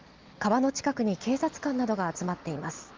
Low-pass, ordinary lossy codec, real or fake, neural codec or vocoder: 7.2 kHz; Opus, 16 kbps; real; none